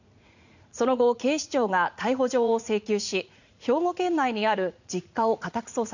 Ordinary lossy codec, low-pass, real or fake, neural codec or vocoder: none; 7.2 kHz; fake; vocoder, 44.1 kHz, 80 mel bands, Vocos